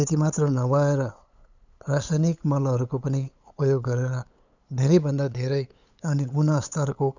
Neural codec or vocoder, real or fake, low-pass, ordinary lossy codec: codec, 16 kHz, 8 kbps, FunCodec, trained on LibriTTS, 25 frames a second; fake; 7.2 kHz; none